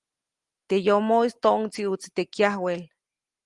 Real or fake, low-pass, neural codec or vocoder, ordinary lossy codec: real; 10.8 kHz; none; Opus, 24 kbps